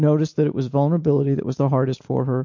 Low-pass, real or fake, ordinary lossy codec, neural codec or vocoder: 7.2 kHz; real; MP3, 48 kbps; none